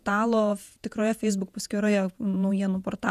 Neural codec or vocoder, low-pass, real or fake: vocoder, 48 kHz, 128 mel bands, Vocos; 14.4 kHz; fake